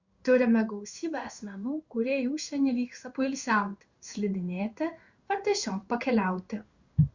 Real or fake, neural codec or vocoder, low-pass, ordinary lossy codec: fake; codec, 16 kHz in and 24 kHz out, 1 kbps, XY-Tokenizer; 7.2 kHz; Opus, 64 kbps